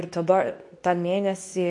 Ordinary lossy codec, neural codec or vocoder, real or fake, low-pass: MP3, 48 kbps; codec, 24 kHz, 0.9 kbps, WavTokenizer, medium speech release version 2; fake; 10.8 kHz